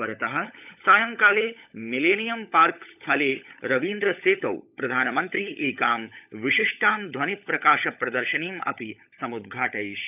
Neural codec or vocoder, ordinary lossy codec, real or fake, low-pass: codec, 16 kHz, 16 kbps, FunCodec, trained on LibriTTS, 50 frames a second; none; fake; 3.6 kHz